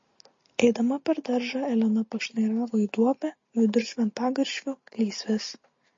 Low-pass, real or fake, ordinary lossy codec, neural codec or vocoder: 7.2 kHz; real; MP3, 32 kbps; none